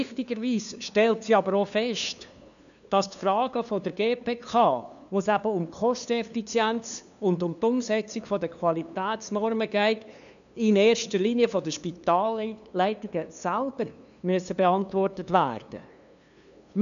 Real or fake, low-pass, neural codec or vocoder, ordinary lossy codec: fake; 7.2 kHz; codec, 16 kHz, 2 kbps, FunCodec, trained on LibriTTS, 25 frames a second; none